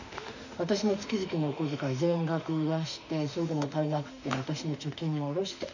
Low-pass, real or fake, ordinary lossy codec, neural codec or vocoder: 7.2 kHz; fake; none; autoencoder, 48 kHz, 32 numbers a frame, DAC-VAE, trained on Japanese speech